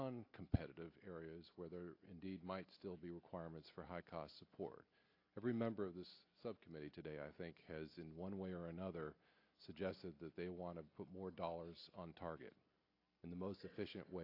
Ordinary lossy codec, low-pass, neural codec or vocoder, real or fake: AAC, 32 kbps; 5.4 kHz; none; real